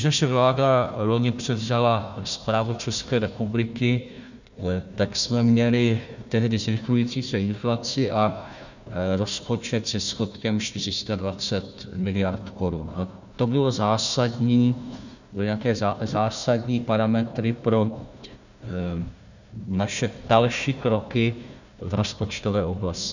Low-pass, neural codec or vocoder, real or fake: 7.2 kHz; codec, 16 kHz, 1 kbps, FunCodec, trained on Chinese and English, 50 frames a second; fake